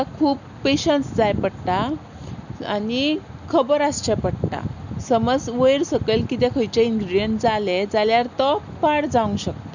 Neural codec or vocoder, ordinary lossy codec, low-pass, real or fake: none; none; 7.2 kHz; real